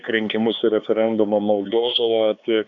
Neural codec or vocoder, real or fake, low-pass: codec, 16 kHz, 4 kbps, X-Codec, HuBERT features, trained on LibriSpeech; fake; 7.2 kHz